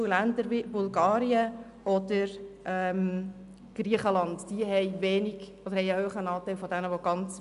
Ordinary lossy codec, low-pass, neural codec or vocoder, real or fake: MP3, 96 kbps; 10.8 kHz; none; real